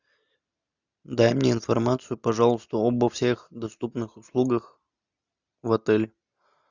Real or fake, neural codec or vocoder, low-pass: real; none; 7.2 kHz